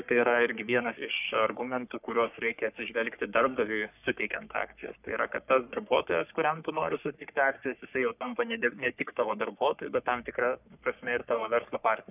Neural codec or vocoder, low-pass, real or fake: codec, 44.1 kHz, 3.4 kbps, Pupu-Codec; 3.6 kHz; fake